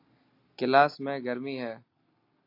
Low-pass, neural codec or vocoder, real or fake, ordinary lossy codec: 5.4 kHz; none; real; AAC, 32 kbps